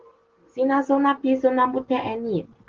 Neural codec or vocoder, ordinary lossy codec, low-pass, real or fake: none; Opus, 16 kbps; 7.2 kHz; real